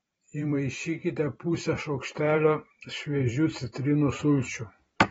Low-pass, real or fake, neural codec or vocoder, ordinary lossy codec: 19.8 kHz; fake; vocoder, 44.1 kHz, 128 mel bands every 512 samples, BigVGAN v2; AAC, 24 kbps